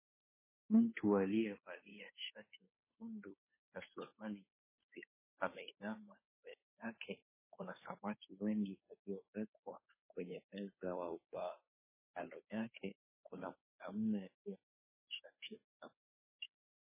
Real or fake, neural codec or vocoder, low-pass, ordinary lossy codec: fake; codec, 16 kHz, 2 kbps, FunCodec, trained on Chinese and English, 25 frames a second; 3.6 kHz; MP3, 16 kbps